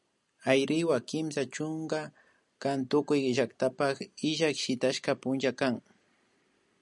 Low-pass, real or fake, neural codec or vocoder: 10.8 kHz; real; none